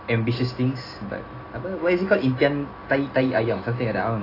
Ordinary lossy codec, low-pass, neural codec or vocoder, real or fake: AAC, 24 kbps; 5.4 kHz; none; real